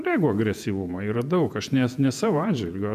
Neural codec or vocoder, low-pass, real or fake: vocoder, 48 kHz, 128 mel bands, Vocos; 14.4 kHz; fake